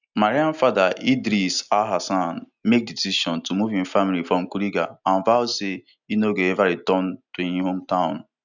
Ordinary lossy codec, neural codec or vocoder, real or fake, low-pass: none; none; real; 7.2 kHz